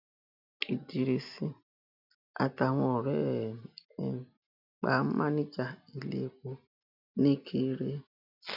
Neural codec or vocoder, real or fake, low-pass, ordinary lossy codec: none; real; 5.4 kHz; none